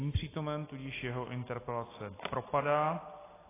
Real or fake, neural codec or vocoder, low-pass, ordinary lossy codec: real; none; 3.6 kHz; AAC, 16 kbps